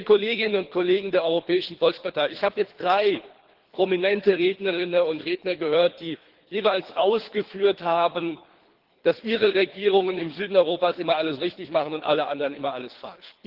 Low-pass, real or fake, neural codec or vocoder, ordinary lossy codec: 5.4 kHz; fake; codec, 24 kHz, 3 kbps, HILCodec; Opus, 16 kbps